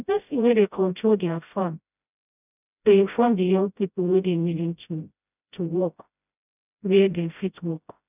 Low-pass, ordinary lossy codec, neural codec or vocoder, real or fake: 3.6 kHz; none; codec, 16 kHz, 0.5 kbps, FreqCodec, smaller model; fake